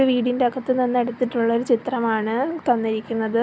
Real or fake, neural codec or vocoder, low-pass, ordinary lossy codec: real; none; none; none